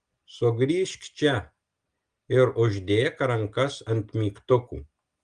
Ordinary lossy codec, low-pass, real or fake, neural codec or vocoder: Opus, 24 kbps; 9.9 kHz; real; none